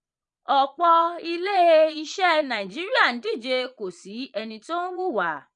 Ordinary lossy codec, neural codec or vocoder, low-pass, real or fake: none; vocoder, 22.05 kHz, 80 mel bands, Vocos; 9.9 kHz; fake